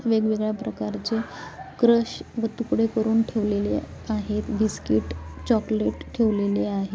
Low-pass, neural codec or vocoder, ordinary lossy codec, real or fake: none; none; none; real